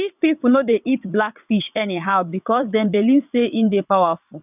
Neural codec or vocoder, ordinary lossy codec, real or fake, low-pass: vocoder, 22.05 kHz, 80 mel bands, WaveNeXt; none; fake; 3.6 kHz